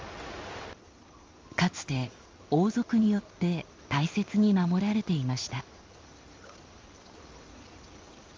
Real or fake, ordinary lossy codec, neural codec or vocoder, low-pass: fake; Opus, 32 kbps; codec, 16 kHz in and 24 kHz out, 1 kbps, XY-Tokenizer; 7.2 kHz